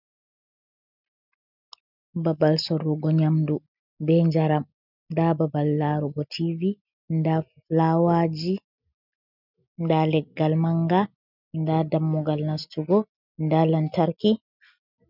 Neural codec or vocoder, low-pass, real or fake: none; 5.4 kHz; real